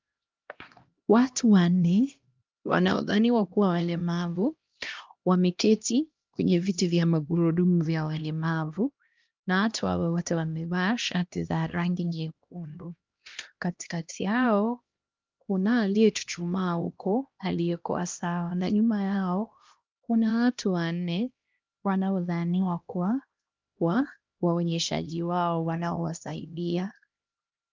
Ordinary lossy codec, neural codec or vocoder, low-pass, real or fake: Opus, 24 kbps; codec, 16 kHz, 1 kbps, X-Codec, HuBERT features, trained on LibriSpeech; 7.2 kHz; fake